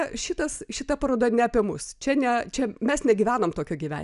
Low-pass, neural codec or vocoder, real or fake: 10.8 kHz; none; real